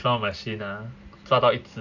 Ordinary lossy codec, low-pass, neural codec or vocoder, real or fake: none; 7.2 kHz; none; real